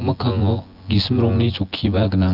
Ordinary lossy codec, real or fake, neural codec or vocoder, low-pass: Opus, 24 kbps; fake; vocoder, 24 kHz, 100 mel bands, Vocos; 5.4 kHz